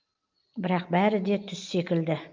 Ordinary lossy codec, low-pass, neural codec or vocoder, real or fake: Opus, 24 kbps; 7.2 kHz; none; real